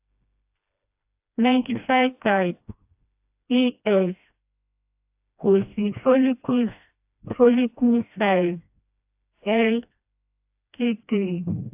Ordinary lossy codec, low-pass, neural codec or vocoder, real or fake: AAC, 32 kbps; 3.6 kHz; codec, 16 kHz, 1 kbps, FreqCodec, smaller model; fake